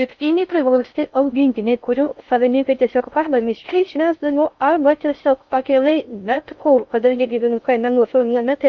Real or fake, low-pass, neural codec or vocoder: fake; 7.2 kHz; codec, 16 kHz in and 24 kHz out, 0.6 kbps, FocalCodec, streaming, 4096 codes